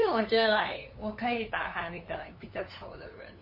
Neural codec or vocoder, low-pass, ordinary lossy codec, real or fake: codec, 16 kHz, 2 kbps, FunCodec, trained on LibriTTS, 25 frames a second; 5.4 kHz; MP3, 24 kbps; fake